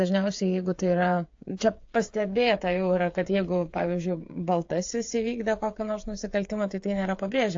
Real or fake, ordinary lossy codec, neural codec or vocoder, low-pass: fake; AAC, 48 kbps; codec, 16 kHz, 8 kbps, FreqCodec, smaller model; 7.2 kHz